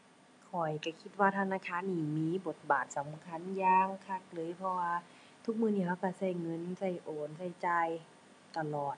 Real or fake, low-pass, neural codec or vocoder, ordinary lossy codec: real; 10.8 kHz; none; none